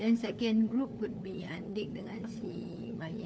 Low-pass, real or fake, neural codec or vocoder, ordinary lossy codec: none; fake; codec, 16 kHz, 4 kbps, FunCodec, trained on LibriTTS, 50 frames a second; none